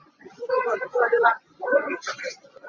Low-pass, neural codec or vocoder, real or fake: 7.2 kHz; vocoder, 24 kHz, 100 mel bands, Vocos; fake